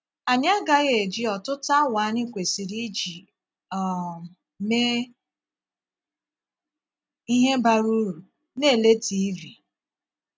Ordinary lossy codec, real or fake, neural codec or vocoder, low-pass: none; real; none; none